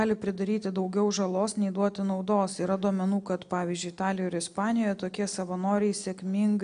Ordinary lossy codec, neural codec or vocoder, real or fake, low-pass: AAC, 96 kbps; none; real; 9.9 kHz